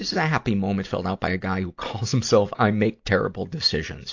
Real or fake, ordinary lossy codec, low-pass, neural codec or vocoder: real; AAC, 48 kbps; 7.2 kHz; none